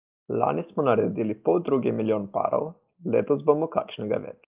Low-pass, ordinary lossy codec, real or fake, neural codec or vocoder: 3.6 kHz; Opus, 32 kbps; real; none